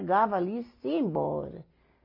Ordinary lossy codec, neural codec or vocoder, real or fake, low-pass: AAC, 32 kbps; none; real; 5.4 kHz